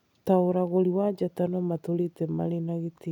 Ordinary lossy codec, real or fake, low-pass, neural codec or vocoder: none; real; 19.8 kHz; none